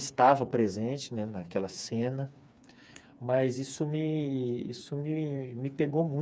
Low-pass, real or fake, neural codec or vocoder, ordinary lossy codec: none; fake; codec, 16 kHz, 4 kbps, FreqCodec, smaller model; none